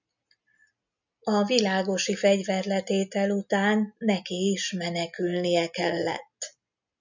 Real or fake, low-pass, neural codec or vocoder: real; 7.2 kHz; none